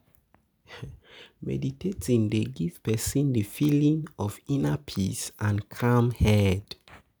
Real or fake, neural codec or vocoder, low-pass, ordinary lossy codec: real; none; none; none